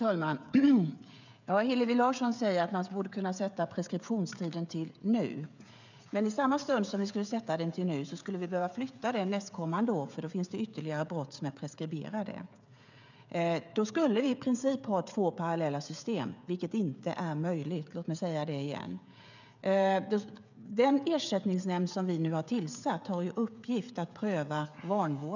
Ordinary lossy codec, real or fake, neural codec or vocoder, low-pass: none; fake; codec, 16 kHz, 16 kbps, FreqCodec, smaller model; 7.2 kHz